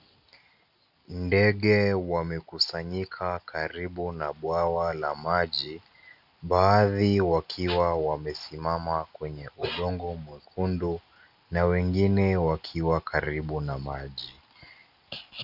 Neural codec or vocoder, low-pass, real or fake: none; 5.4 kHz; real